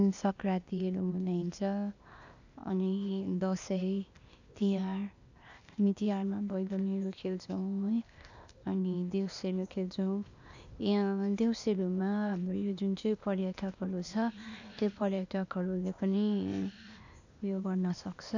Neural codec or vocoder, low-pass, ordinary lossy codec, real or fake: codec, 16 kHz, 0.8 kbps, ZipCodec; 7.2 kHz; none; fake